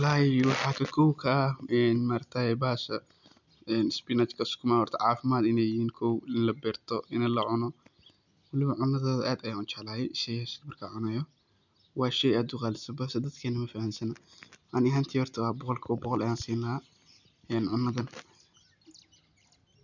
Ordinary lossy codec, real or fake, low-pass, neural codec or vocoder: none; real; 7.2 kHz; none